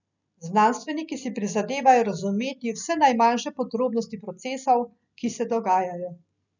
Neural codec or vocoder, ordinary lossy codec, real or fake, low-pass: none; none; real; 7.2 kHz